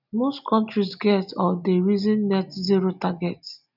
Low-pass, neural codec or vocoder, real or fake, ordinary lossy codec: 5.4 kHz; none; real; none